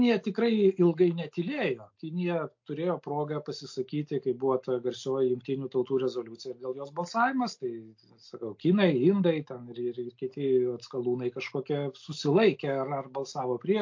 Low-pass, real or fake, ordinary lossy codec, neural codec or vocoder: 7.2 kHz; real; MP3, 48 kbps; none